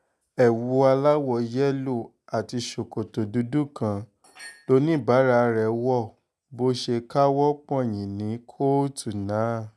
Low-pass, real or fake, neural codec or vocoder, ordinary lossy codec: none; real; none; none